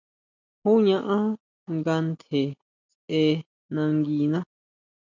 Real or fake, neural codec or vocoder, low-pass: real; none; 7.2 kHz